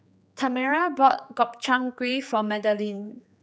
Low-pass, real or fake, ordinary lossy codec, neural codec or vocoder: none; fake; none; codec, 16 kHz, 4 kbps, X-Codec, HuBERT features, trained on general audio